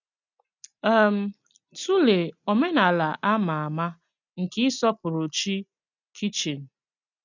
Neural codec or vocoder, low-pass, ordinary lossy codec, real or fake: none; 7.2 kHz; none; real